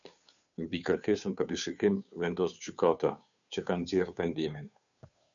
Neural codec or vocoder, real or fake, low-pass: codec, 16 kHz, 2 kbps, FunCodec, trained on Chinese and English, 25 frames a second; fake; 7.2 kHz